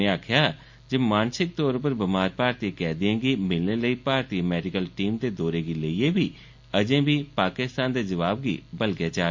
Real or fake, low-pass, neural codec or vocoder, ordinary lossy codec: real; 7.2 kHz; none; MP3, 48 kbps